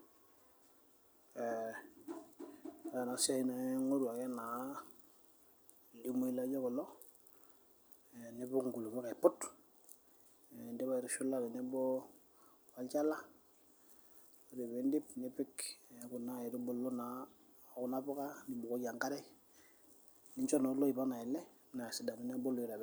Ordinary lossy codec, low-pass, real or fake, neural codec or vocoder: none; none; real; none